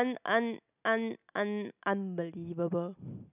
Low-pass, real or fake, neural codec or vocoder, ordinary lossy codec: 3.6 kHz; real; none; none